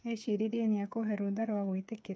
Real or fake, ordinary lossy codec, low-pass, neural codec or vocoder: fake; none; none; codec, 16 kHz, 8 kbps, FreqCodec, smaller model